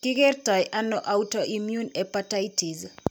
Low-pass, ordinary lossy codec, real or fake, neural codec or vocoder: none; none; real; none